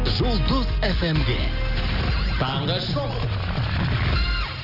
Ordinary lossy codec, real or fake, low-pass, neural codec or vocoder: Opus, 32 kbps; real; 5.4 kHz; none